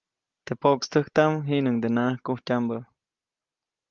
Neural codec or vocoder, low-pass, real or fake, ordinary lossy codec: none; 7.2 kHz; real; Opus, 32 kbps